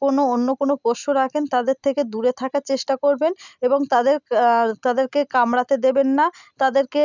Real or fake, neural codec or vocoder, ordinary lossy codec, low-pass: real; none; none; 7.2 kHz